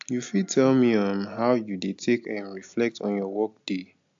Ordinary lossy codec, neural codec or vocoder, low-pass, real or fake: AAC, 64 kbps; none; 7.2 kHz; real